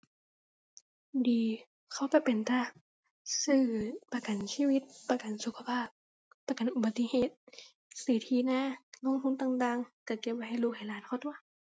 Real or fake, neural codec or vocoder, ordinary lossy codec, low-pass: real; none; none; none